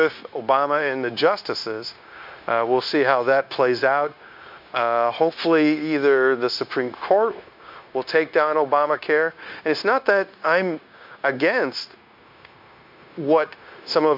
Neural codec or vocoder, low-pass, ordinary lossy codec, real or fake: codec, 16 kHz, 0.9 kbps, LongCat-Audio-Codec; 5.4 kHz; MP3, 48 kbps; fake